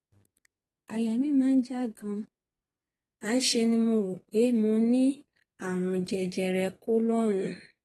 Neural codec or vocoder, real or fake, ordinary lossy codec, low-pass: codec, 32 kHz, 1.9 kbps, SNAC; fake; AAC, 32 kbps; 14.4 kHz